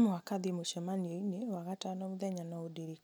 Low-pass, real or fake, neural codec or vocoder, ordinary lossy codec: none; real; none; none